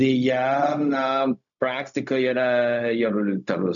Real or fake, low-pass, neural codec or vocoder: fake; 7.2 kHz; codec, 16 kHz, 0.4 kbps, LongCat-Audio-Codec